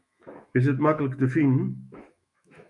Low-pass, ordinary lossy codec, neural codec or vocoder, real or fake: 10.8 kHz; MP3, 96 kbps; autoencoder, 48 kHz, 128 numbers a frame, DAC-VAE, trained on Japanese speech; fake